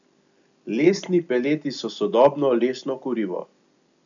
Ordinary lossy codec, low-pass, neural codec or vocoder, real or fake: none; 7.2 kHz; none; real